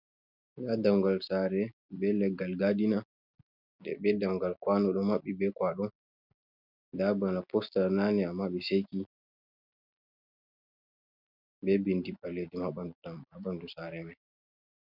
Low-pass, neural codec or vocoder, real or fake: 5.4 kHz; none; real